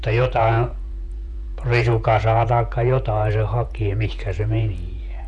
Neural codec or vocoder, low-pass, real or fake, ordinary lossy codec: vocoder, 48 kHz, 128 mel bands, Vocos; 10.8 kHz; fake; none